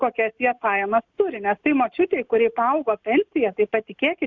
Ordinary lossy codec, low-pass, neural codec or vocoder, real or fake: Opus, 64 kbps; 7.2 kHz; none; real